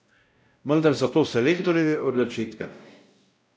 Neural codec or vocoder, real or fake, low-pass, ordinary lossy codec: codec, 16 kHz, 0.5 kbps, X-Codec, WavLM features, trained on Multilingual LibriSpeech; fake; none; none